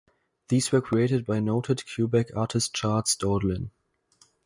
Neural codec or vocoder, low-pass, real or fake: none; 10.8 kHz; real